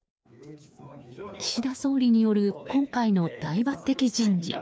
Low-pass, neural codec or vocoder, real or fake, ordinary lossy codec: none; codec, 16 kHz, 2 kbps, FreqCodec, larger model; fake; none